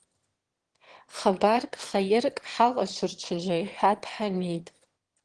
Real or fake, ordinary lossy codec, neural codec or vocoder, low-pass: fake; Opus, 16 kbps; autoencoder, 22.05 kHz, a latent of 192 numbers a frame, VITS, trained on one speaker; 9.9 kHz